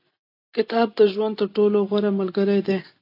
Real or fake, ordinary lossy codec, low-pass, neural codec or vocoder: real; AAC, 32 kbps; 5.4 kHz; none